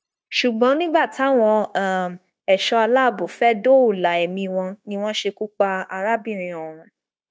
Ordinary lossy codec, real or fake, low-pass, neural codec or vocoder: none; fake; none; codec, 16 kHz, 0.9 kbps, LongCat-Audio-Codec